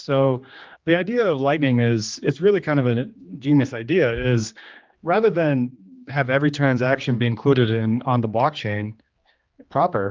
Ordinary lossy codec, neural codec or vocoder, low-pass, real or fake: Opus, 32 kbps; codec, 16 kHz, 2 kbps, X-Codec, HuBERT features, trained on general audio; 7.2 kHz; fake